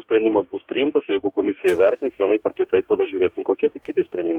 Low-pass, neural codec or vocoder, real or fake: 19.8 kHz; codec, 44.1 kHz, 2.6 kbps, DAC; fake